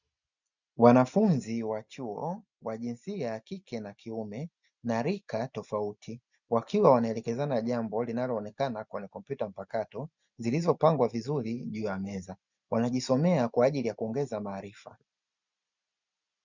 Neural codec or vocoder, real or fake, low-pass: none; real; 7.2 kHz